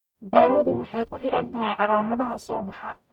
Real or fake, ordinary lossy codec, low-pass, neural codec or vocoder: fake; none; 19.8 kHz; codec, 44.1 kHz, 0.9 kbps, DAC